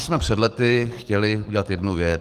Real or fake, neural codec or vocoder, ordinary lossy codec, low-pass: fake; codec, 44.1 kHz, 7.8 kbps, DAC; Opus, 24 kbps; 14.4 kHz